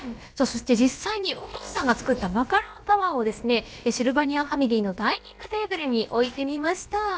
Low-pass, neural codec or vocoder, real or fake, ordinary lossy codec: none; codec, 16 kHz, about 1 kbps, DyCAST, with the encoder's durations; fake; none